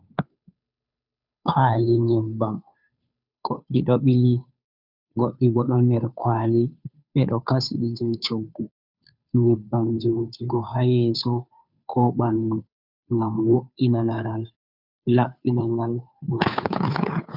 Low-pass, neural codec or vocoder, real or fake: 5.4 kHz; codec, 16 kHz, 2 kbps, FunCodec, trained on Chinese and English, 25 frames a second; fake